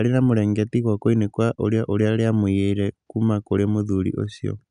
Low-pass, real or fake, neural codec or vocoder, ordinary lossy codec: 9.9 kHz; real; none; none